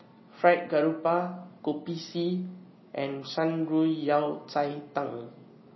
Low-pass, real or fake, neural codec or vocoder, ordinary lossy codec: 7.2 kHz; real; none; MP3, 24 kbps